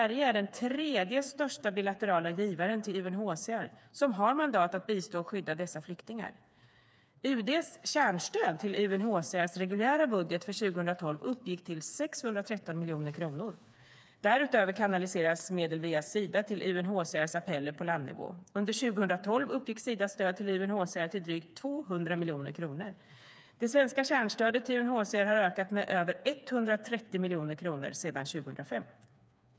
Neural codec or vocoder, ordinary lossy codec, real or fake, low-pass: codec, 16 kHz, 4 kbps, FreqCodec, smaller model; none; fake; none